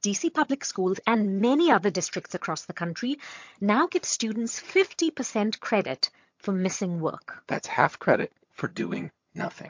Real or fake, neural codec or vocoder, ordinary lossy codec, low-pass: fake; vocoder, 22.05 kHz, 80 mel bands, HiFi-GAN; MP3, 48 kbps; 7.2 kHz